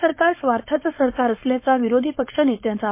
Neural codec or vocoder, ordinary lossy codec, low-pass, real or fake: codec, 16 kHz, 4.8 kbps, FACodec; MP3, 24 kbps; 3.6 kHz; fake